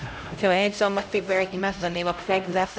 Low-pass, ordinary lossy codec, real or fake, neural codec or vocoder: none; none; fake; codec, 16 kHz, 0.5 kbps, X-Codec, HuBERT features, trained on LibriSpeech